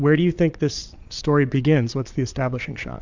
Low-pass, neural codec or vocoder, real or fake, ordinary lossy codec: 7.2 kHz; vocoder, 22.05 kHz, 80 mel bands, Vocos; fake; MP3, 64 kbps